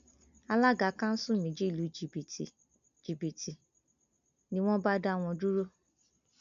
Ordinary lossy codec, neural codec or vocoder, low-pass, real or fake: none; none; 7.2 kHz; real